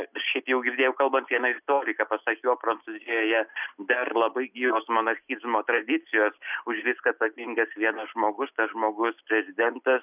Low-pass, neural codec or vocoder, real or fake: 3.6 kHz; none; real